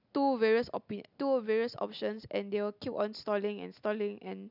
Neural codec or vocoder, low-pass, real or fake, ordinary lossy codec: none; 5.4 kHz; real; none